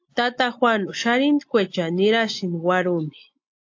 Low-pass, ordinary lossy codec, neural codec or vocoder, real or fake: 7.2 kHz; AAC, 48 kbps; none; real